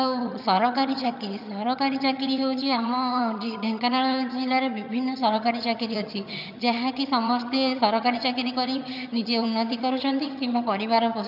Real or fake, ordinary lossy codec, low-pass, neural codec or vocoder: fake; none; 5.4 kHz; vocoder, 22.05 kHz, 80 mel bands, HiFi-GAN